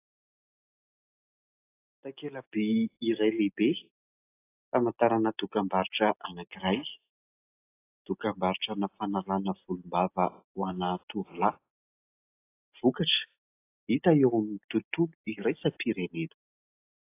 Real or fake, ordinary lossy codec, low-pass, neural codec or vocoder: real; AAC, 24 kbps; 3.6 kHz; none